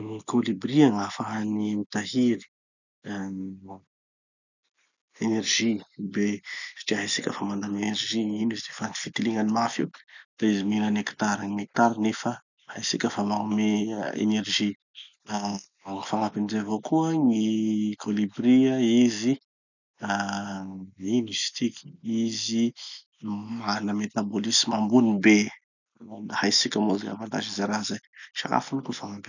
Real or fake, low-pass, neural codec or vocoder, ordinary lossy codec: real; 7.2 kHz; none; none